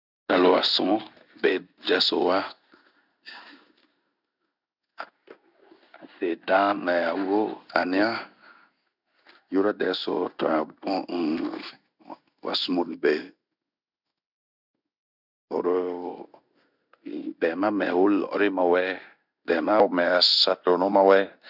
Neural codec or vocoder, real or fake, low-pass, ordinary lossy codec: codec, 16 kHz in and 24 kHz out, 1 kbps, XY-Tokenizer; fake; 5.4 kHz; none